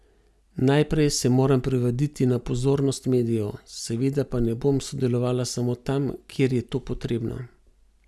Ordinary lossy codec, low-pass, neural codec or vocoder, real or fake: none; none; none; real